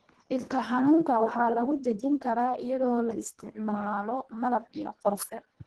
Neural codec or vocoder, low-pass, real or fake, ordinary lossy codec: codec, 24 kHz, 1.5 kbps, HILCodec; 10.8 kHz; fake; Opus, 16 kbps